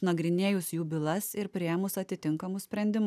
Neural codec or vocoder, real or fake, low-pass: none; real; 14.4 kHz